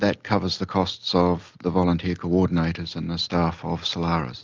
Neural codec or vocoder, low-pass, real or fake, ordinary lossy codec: none; 7.2 kHz; real; Opus, 24 kbps